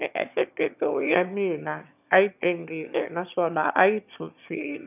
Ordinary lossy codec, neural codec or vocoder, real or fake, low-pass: none; autoencoder, 22.05 kHz, a latent of 192 numbers a frame, VITS, trained on one speaker; fake; 3.6 kHz